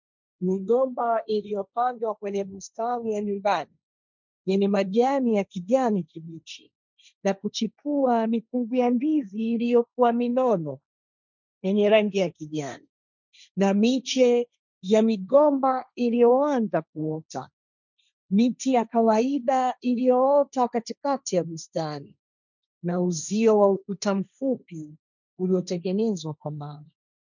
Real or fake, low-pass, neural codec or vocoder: fake; 7.2 kHz; codec, 16 kHz, 1.1 kbps, Voila-Tokenizer